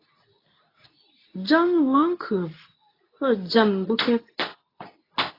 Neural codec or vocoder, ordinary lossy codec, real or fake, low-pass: codec, 24 kHz, 0.9 kbps, WavTokenizer, medium speech release version 2; AAC, 24 kbps; fake; 5.4 kHz